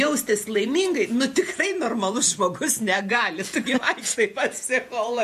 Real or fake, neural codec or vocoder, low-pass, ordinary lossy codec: fake; vocoder, 48 kHz, 128 mel bands, Vocos; 14.4 kHz; MP3, 64 kbps